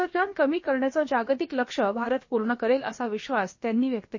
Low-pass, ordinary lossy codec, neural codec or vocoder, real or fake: 7.2 kHz; MP3, 32 kbps; codec, 16 kHz, about 1 kbps, DyCAST, with the encoder's durations; fake